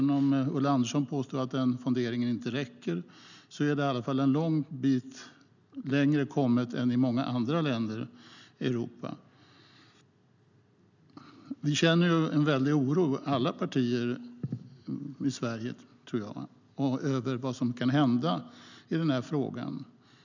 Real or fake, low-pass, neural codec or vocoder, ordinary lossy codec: real; 7.2 kHz; none; none